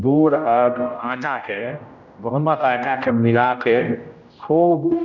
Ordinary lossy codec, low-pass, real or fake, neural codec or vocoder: none; 7.2 kHz; fake; codec, 16 kHz, 0.5 kbps, X-Codec, HuBERT features, trained on general audio